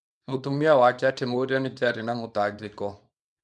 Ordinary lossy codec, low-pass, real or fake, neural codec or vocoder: none; none; fake; codec, 24 kHz, 0.9 kbps, WavTokenizer, small release